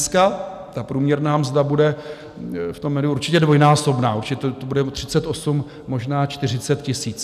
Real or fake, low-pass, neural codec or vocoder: real; 14.4 kHz; none